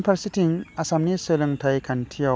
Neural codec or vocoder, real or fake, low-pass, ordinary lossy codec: none; real; none; none